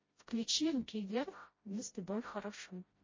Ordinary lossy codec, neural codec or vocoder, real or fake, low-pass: MP3, 32 kbps; codec, 16 kHz, 0.5 kbps, FreqCodec, smaller model; fake; 7.2 kHz